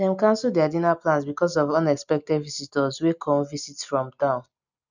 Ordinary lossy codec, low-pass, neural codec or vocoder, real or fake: none; 7.2 kHz; none; real